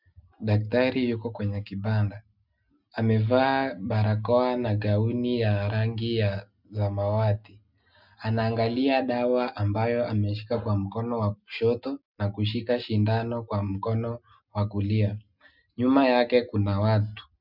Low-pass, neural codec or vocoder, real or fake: 5.4 kHz; none; real